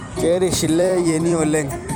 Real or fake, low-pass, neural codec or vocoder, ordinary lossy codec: fake; none; vocoder, 44.1 kHz, 128 mel bands every 256 samples, BigVGAN v2; none